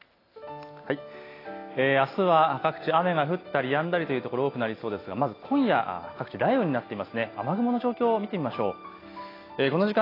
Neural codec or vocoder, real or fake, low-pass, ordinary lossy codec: none; real; 5.4 kHz; AAC, 24 kbps